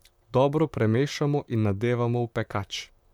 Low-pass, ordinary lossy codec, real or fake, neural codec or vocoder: 19.8 kHz; none; fake; vocoder, 44.1 kHz, 128 mel bands, Pupu-Vocoder